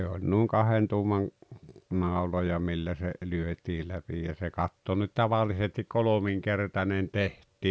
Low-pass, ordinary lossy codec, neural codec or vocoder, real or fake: none; none; none; real